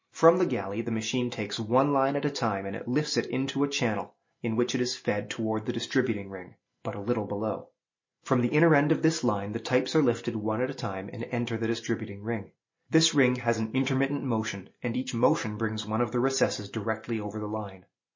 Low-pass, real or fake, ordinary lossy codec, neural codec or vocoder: 7.2 kHz; real; MP3, 32 kbps; none